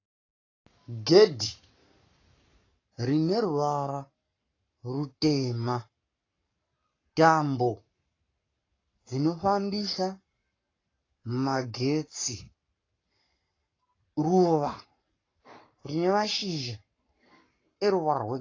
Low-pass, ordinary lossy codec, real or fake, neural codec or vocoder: 7.2 kHz; AAC, 32 kbps; fake; codec, 44.1 kHz, 7.8 kbps, Pupu-Codec